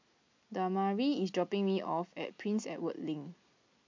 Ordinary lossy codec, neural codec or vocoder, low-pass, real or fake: MP3, 48 kbps; none; 7.2 kHz; real